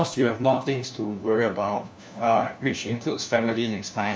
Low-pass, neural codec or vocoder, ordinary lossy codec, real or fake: none; codec, 16 kHz, 1 kbps, FunCodec, trained on LibriTTS, 50 frames a second; none; fake